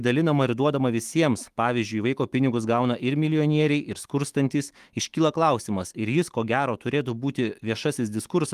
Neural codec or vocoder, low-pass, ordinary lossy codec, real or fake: autoencoder, 48 kHz, 128 numbers a frame, DAC-VAE, trained on Japanese speech; 14.4 kHz; Opus, 24 kbps; fake